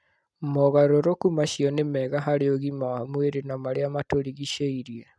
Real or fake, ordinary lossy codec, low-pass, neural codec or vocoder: real; none; none; none